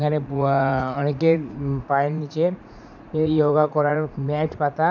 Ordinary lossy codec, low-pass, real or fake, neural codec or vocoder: none; 7.2 kHz; fake; vocoder, 44.1 kHz, 80 mel bands, Vocos